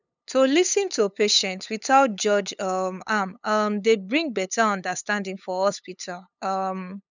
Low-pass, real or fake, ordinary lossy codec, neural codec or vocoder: 7.2 kHz; fake; none; codec, 16 kHz, 8 kbps, FunCodec, trained on LibriTTS, 25 frames a second